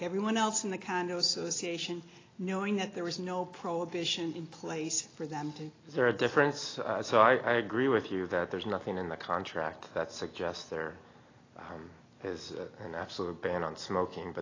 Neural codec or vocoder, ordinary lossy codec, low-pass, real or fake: none; AAC, 32 kbps; 7.2 kHz; real